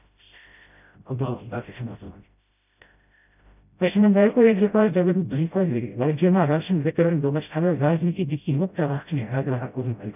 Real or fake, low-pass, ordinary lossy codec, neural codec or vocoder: fake; 3.6 kHz; none; codec, 16 kHz, 0.5 kbps, FreqCodec, smaller model